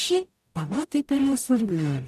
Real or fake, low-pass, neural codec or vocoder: fake; 14.4 kHz; codec, 44.1 kHz, 0.9 kbps, DAC